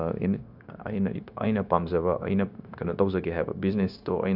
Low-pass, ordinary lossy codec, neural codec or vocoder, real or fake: 5.4 kHz; none; codec, 16 kHz, 0.9 kbps, LongCat-Audio-Codec; fake